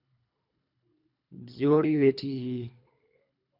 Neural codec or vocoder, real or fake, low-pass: codec, 24 kHz, 3 kbps, HILCodec; fake; 5.4 kHz